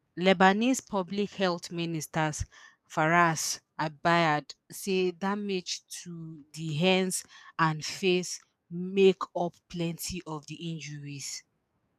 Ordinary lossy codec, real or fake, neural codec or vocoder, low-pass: none; fake; codec, 44.1 kHz, 7.8 kbps, DAC; 14.4 kHz